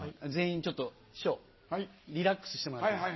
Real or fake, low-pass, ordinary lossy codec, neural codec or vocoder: real; 7.2 kHz; MP3, 24 kbps; none